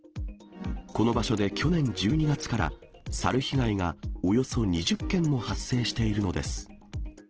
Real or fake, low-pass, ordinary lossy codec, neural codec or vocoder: real; 7.2 kHz; Opus, 16 kbps; none